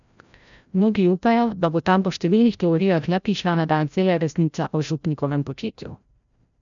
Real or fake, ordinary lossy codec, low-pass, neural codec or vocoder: fake; none; 7.2 kHz; codec, 16 kHz, 0.5 kbps, FreqCodec, larger model